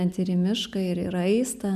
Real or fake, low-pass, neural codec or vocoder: real; 14.4 kHz; none